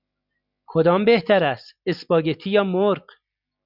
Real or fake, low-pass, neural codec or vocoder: real; 5.4 kHz; none